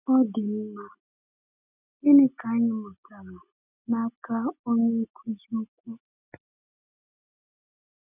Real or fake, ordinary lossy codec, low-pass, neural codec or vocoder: real; none; 3.6 kHz; none